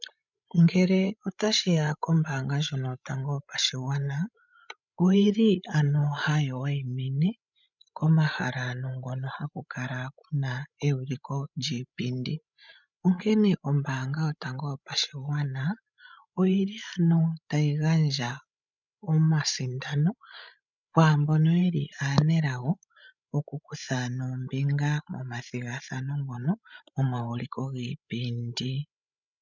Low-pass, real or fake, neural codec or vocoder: 7.2 kHz; fake; codec, 16 kHz, 16 kbps, FreqCodec, larger model